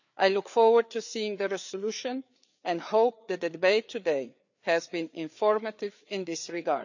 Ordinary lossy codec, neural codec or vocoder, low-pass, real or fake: none; codec, 16 kHz, 4 kbps, FreqCodec, larger model; 7.2 kHz; fake